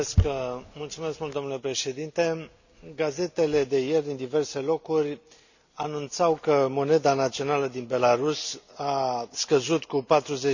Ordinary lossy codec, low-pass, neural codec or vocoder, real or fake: none; 7.2 kHz; none; real